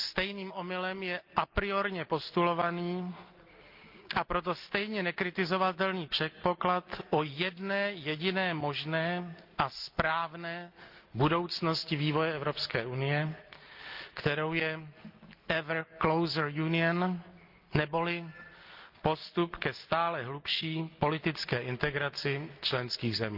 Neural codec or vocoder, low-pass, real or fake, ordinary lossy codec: none; 5.4 kHz; real; Opus, 24 kbps